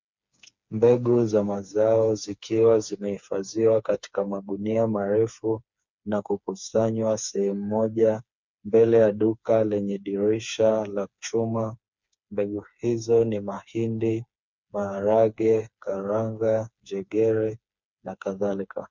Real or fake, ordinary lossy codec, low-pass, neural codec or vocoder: fake; MP3, 64 kbps; 7.2 kHz; codec, 16 kHz, 4 kbps, FreqCodec, smaller model